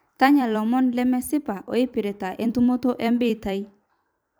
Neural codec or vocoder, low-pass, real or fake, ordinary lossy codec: vocoder, 44.1 kHz, 128 mel bands every 256 samples, BigVGAN v2; none; fake; none